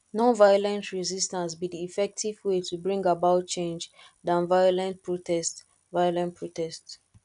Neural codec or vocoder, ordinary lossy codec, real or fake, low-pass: none; none; real; 10.8 kHz